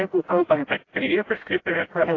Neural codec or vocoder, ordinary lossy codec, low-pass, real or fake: codec, 16 kHz, 0.5 kbps, FreqCodec, smaller model; AAC, 32 kbps; 7.2 kHz; fake